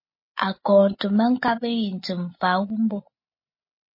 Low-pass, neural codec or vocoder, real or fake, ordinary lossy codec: 5.4 kHz; none; real; MP3, 24 kbps